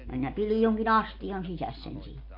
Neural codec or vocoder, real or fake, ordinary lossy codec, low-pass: none; real; MP3, 32 kbps; 5.4 kHz